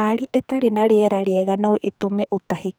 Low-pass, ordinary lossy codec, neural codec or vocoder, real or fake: none; none; codec, 44.1 kHz, 2.6 kbps, SNAC; fake